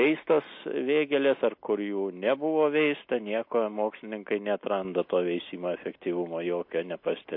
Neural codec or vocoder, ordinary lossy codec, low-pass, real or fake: none; MP3, 32 kbps; 5.4 kHz; real